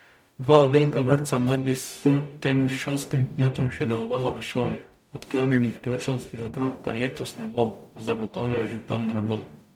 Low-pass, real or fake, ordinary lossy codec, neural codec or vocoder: 19.8 kHz; fake; MP3, 96 kbps; codec, 44.1 kHz, 0.9 kbps, DAC